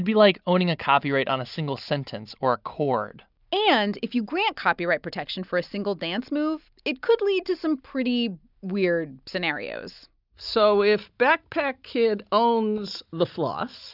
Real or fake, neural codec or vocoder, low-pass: real; none; 5.4 kHz